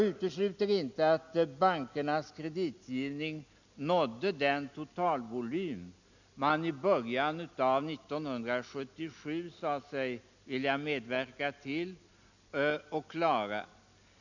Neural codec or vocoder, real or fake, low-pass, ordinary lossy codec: none; real; 7.2 kHz; none